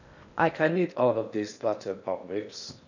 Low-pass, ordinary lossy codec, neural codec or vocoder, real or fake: 7.2 kHz; none; codec, 16 kHz in and 24 kHz out, 0.8 kbps, FocalCodec, streaming, 65536 codes; fake